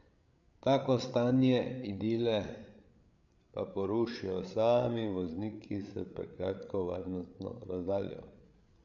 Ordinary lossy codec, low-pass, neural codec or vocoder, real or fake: none; 7.2 kHz; codec, 16 kHz, 8 kbps, FreqCodec, larger model; fake